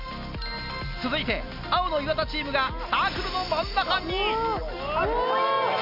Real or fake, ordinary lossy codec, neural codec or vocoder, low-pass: real; none; none; 5.4 kHz